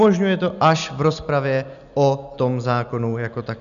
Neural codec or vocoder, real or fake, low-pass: none; real; 7.2 kHz